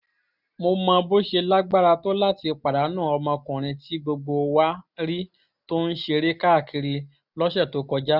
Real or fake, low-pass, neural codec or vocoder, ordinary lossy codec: real; 5.4 kHz; none; none